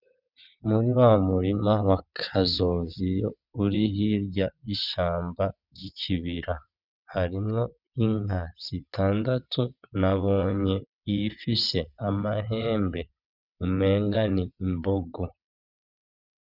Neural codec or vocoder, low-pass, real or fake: vocoder, 22.05 kHz, 80 mel bands, WaveNeXt; 5.4 kHz; fake